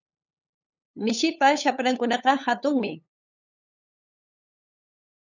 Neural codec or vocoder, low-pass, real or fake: codec, 16 kHz, 8 kbps, FunCodec, trained on LibriTTS, 25 frames a second; 7.2 kHz; fake